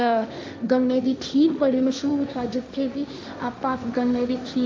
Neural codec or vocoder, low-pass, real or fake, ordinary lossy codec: codec, 16 kHz, 1.1 kbps, Voila-Tokenizer; none; fake; none